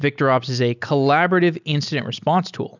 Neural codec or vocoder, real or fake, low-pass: none; real; 7.2 kHz